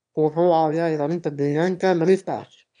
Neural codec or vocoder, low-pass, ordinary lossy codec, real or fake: autoencoder, 22.05 kHz, a latent of 192 numbers a frame, VITS, trained on one speaker; 9.9 kHz; none; fake